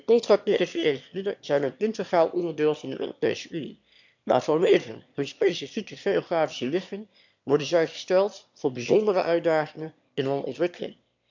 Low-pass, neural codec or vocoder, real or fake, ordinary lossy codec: 7.2 kHz; autoencoder, 22.05 kHz, a latent of 192 numbers a frame, VITS, trained on one speaker; fake; MP3, 64 kbps